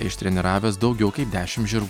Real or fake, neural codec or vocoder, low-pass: real; none; 19.8 kHz